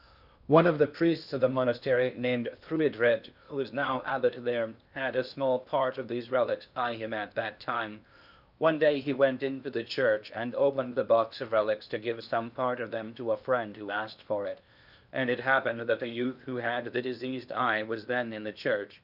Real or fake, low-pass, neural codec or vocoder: fake; 5.4 kHz; codec, 16 kHz in and 24 kHz out, 0.8 kbps, FocalCodec, streaming, 65536 codes